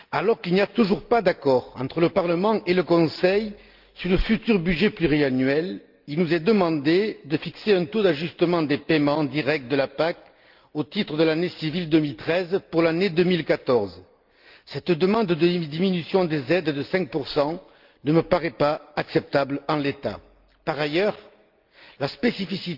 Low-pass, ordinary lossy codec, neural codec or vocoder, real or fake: 5.4 kHz; Opus, 32 kbps; none; real